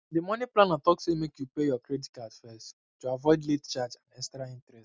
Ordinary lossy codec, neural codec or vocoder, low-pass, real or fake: none; none; none; real